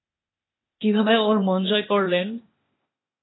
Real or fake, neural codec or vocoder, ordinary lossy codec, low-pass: fake; codec, 16 kHz, 0.8 kbps, ZipCodec; AAC, 16 kbps; 7.2 kHz